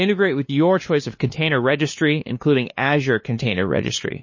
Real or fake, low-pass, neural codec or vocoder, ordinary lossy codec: fake; 7.2 kHz; codec, 16 kHz, 2 kbps, FunCodec, trained on LibriTTS, 25 frames a second; MP3, 32 kbps